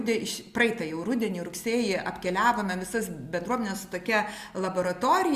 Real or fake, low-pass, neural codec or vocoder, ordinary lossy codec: real; 14.4 kHz; none; Opus, 64 kbps